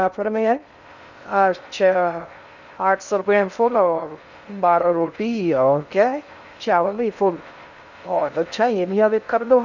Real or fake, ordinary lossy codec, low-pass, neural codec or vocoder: fake; none; 7.2 kHz; codec, 16 kHz in and 24 kHz out, 0.6 kbps, FocalCodec, streaming, 2048 codes